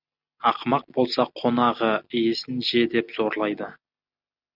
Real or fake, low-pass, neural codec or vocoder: real; 5.4 kHz; none